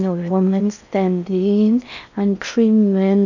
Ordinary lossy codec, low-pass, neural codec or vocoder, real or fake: none; 7.2 kHz; codec, 16 kHz in and 24 kHz out, 0.6 kbps, FocalCodec, streaming, 4096 codes; fake